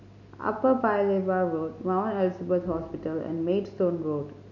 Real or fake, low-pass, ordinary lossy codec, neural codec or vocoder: real; 7.2 kHz; none; none